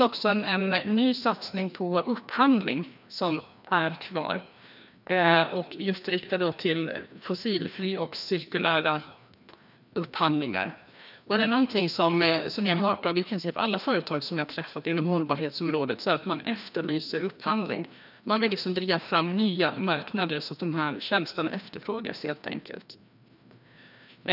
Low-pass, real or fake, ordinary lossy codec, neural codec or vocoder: 5.4 kHz; fake; none; codec, 16 kHz, 1 kbps, FreqCodec, larger model